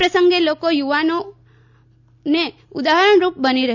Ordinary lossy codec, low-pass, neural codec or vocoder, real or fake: none; 7.2 kHz; none; real